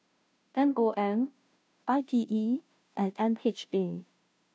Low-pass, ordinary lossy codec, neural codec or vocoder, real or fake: none; none; codec, 16 kHz, 0.5 kbps, FunCodec, trained on Chinese and English, 25 frames a second; fake